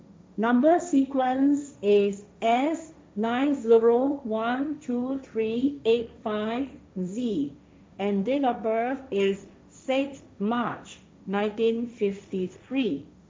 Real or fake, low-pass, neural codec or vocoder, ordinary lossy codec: fake; none; codec, 16 kHz, 1.1 kbps, Voila-Tokenizer; none